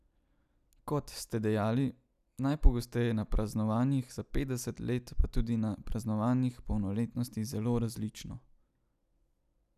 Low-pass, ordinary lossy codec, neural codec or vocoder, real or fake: 14.4 kHz; none; none; real